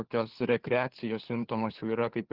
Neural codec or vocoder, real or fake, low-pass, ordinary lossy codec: codec, 16 kHz, 4 kbps, FunCodec, trained on LibriTTS, 50 frames a second; fake; 5.4 kHz; Opus, 16 kbps